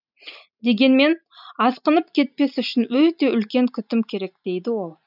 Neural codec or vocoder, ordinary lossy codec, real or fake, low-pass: none; none; real; 5.4 kHz